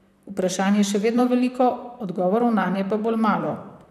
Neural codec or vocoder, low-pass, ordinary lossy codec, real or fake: vocoder, 44.1 kHz, 128 mel bands, Pupu-Vocoder; 14.4 kHz; none; fake